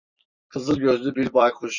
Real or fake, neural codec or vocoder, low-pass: fake; vocoder, 44.1 kHz, 128 mel bands every 256 samples, BigVGAN v2; 7.2 kHz